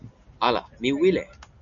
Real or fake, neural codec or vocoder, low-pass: real; none; 7.2 kHz